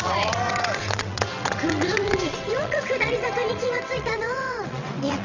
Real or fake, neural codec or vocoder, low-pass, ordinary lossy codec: fake; vocoder, 22.05 kHz, 80 mel bands, WaveNeXt; 7.2 kHz; none